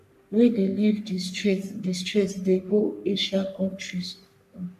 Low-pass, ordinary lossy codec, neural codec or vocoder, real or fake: 14.4 kHz; none; codec, 44.1 kHz, 3.4 kbps, Pupu-Codec; fake